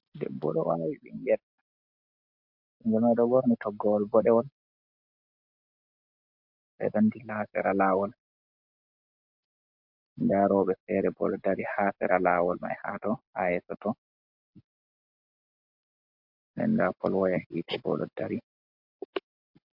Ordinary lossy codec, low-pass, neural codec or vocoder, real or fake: MP3, 48 kbps; 5.4 kHz; none; real